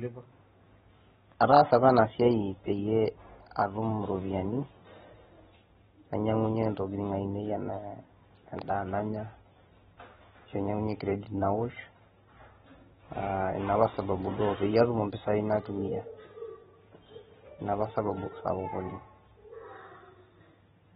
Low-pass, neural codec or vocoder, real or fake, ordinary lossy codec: 19.8 kHz; vocoder, 44.1 kHz, 128 mel bands every 512 samples, BigVGAN v2; fake; AAC, 16 kbps